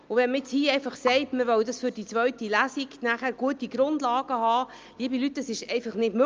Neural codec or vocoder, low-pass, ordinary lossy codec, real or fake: none; 7.2 kHz; Opus, 24 kbps; real